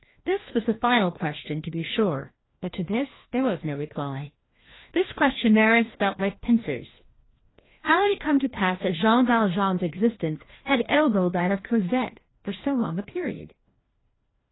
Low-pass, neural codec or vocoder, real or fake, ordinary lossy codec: 7.2 kHz; codec, 16 kHz, 1 kbps, FreqCodec, larger model; fake; AAC, 16 kbps